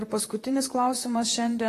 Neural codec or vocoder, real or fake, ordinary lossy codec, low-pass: vocoder, 44.1 kHz, 128 mel bands, Pupu-Vocoder; fake; AAC, 48 kbps; 14.4 kHz